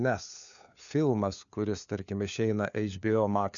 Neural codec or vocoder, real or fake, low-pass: codec, 16 kHz, 4 kbps, FunCodec, trained on LibriTTS, 50 frames a second; fake; 7.2 kHz